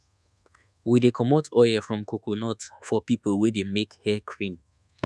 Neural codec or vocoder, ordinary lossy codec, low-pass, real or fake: codec, 24 kHz, 1.2 kbps, DualCodec; none; none; fake